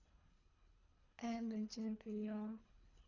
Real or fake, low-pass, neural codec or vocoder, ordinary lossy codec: fake; 7.2 kHz; codec, 24 kHz, 3 kbps, HILCodec; none